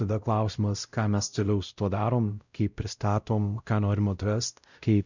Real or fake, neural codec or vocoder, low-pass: fake; codec, 16 kHz, 0.5 kbps, X-Codec, WavLM features, trained on Multilingual LibriSpeech; 7.2 kHz